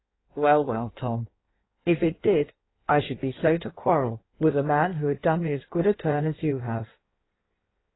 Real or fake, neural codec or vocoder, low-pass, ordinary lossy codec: fake; codec, 16 kHz in and 24 kHz out, 1.1 kbps, FireRedTTS-2 codec; 7.2 kHz; AAC, 16 kbps